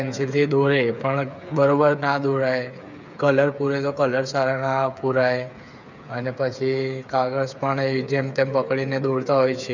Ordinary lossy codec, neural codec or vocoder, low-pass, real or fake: none; codec, 16 kHz, 8 kbps, FreqCodec, smaller model; 7.2 kHz; fake